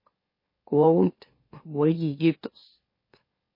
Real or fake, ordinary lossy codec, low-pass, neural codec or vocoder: fake; MP3, 24 kbps; 5.4 kHz; autoencoder, 44.1 kHz, a latent of 192 numbers a frame, MeloTTS